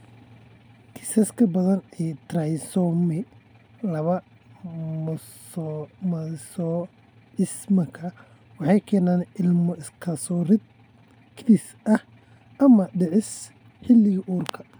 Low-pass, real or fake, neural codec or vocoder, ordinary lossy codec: none; real; none; none